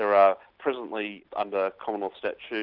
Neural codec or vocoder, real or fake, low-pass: none; real; 5.4 kHz